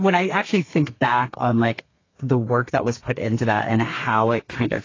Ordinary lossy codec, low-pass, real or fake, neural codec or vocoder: AAC, 32 kbps; 7.2 kHz; fake; codec, 32 kHz, 1.9 kbps, SNAC